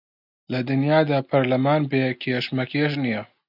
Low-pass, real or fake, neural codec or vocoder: 5.4 kHz; real; none